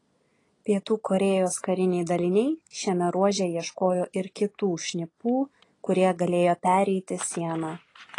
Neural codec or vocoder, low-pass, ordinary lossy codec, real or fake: none; 10.8 kHz; AAC, 32 kbps; real